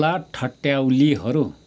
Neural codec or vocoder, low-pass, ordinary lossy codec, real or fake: none; none; none; real